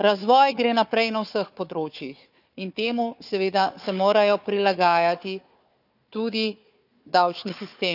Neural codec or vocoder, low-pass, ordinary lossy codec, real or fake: codec, 16 kHz, 4 kbps, FunCodec, trained on Chinese and English, 50 frames a second; 5.4 kHz; none; fake